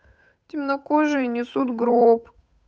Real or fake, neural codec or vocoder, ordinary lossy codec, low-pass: fake; codec, 16 kHz, 8 kbps, FunCodec, trained on Chinese and English, 25 frames a second; none; none